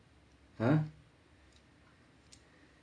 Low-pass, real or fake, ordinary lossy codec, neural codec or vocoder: 9.9 kHz; real; AAC, 64 kbps; none